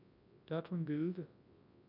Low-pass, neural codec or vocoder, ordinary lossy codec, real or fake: 5.4 kHz; codec, 24 kHz, 0.9 kbps, WavTokenizer, large speech release; AAC, 32 kbps; fake